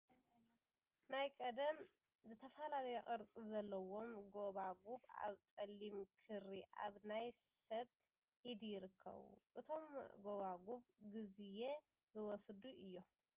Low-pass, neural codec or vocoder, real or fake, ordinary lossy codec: 3.6 kHz; none; real; Opus, 24 kbps